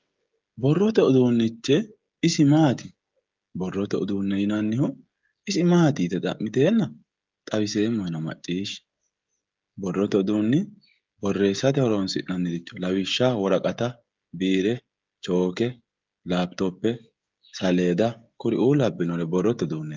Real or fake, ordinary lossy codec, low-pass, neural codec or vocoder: fake; Opus, 32 kbps; 7.2 kHz; codec, 16 kHz, 16 kbps, FreqCodec, smaller model